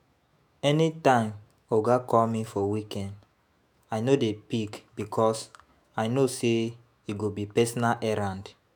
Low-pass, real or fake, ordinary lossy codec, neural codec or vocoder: none; fake; none; autoencoder, 48 kHz, 128 numbers a frame, DAC-VAE, trained on Japanese speech